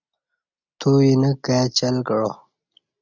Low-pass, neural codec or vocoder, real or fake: 7.2 kHz; none; real